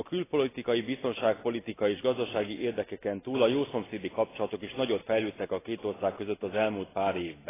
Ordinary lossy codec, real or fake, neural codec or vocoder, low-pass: AAC, 16 kbps; real; none; 3.6 kHz